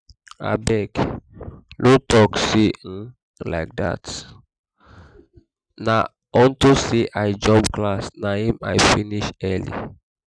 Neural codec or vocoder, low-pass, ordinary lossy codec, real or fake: none; 9.9 kHz; none; real